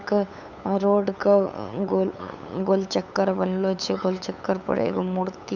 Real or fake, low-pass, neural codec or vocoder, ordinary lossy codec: fake; 7.2 kHz; codec, 16 kHz, 8 kbps, FunCodec, trained on LibriTTS, 25 frames a second; none